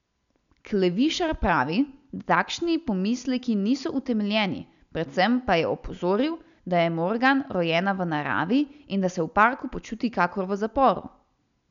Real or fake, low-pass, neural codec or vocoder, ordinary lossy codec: real; 7.2 kHz; none; none